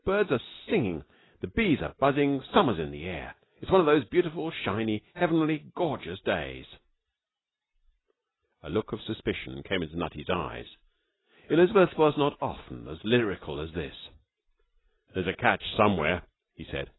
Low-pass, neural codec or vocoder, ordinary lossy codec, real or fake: 7.2 kHz; none; AAC, 16 kbps; real